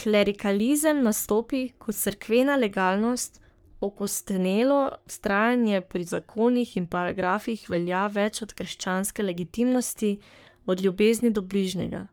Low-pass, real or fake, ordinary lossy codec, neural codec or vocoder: none; fake; none; codec, 44.1 kHz, 3.4 kbps, Pupu-Codec